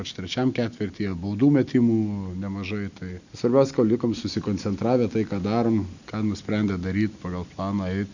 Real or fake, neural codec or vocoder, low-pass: real; none; 7.2 kHz